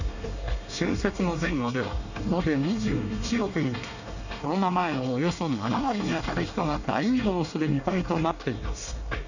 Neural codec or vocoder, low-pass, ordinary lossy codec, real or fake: codec, 24 kHz, 1 kbps, SNAC; 7.2 kHz; none; fake